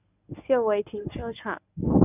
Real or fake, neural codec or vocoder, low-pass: fake; codec, 24 kHz, 0.9 kbps, WavTokenizer, medium speech release version 1; 3.6 kHz